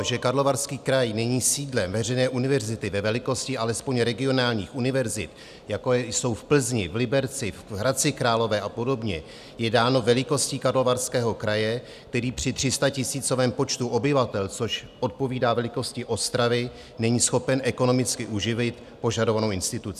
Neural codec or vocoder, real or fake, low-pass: none; real; 14.4 kHz